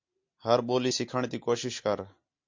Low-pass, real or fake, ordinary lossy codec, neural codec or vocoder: 7.2 kHz; real; MP3, 48 kbps; none